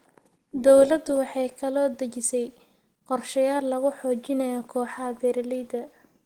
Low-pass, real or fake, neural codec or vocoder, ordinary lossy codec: 19.8 kHz; real; none; Opus, 16 kbps